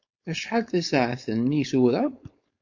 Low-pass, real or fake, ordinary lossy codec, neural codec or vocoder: 7.2 kHz; fake; MP3, 48 kbps; codec, 16 kHz, 4.8 kbps, FACodec